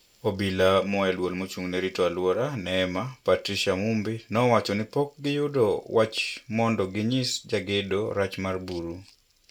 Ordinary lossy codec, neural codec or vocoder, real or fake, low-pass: none; none; real; 19.8 kHz